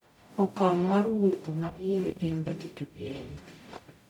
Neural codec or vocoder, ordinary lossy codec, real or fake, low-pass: codec, 44.1 kHz, 0.9 kbps, DAC; none; fake; 19.8 kHz